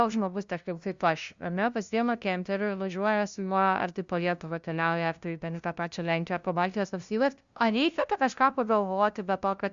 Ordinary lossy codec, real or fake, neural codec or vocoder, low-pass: Opus, 64 kbps; fake; codec, 16 kHz, 0.5 kbps, FunCodec, trained on LibriTTS, 25 frames a second; 7.2 kHz